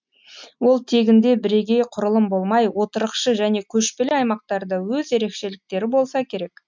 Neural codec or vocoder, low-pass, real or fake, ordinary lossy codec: none; 7.2 kHz; real; MP3, 64 kbps